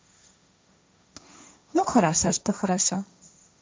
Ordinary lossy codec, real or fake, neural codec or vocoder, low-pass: none; fake; codec, 16 kHz, 1.1 kbps, Voila-Tokenizer; none